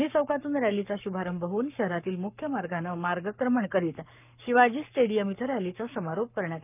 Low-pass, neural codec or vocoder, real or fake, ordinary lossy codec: 3.6 kHz; codec, 44.1 kHz, 7.8 kbps, Pupu-Codec; fake; none